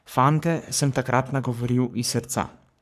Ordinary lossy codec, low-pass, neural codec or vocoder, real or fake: none; 14.4 kHz; codec, 44.1 kHz, 3.4 kbps, Pupu-Codec; fake